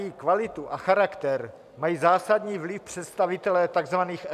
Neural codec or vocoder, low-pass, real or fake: none; 14.4 kHz; real